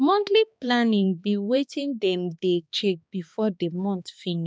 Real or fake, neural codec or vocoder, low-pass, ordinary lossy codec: fake; codec, 16 kHz, 2 kbps, X-Codec, HuBERT features, trained on balanced general audio; none; none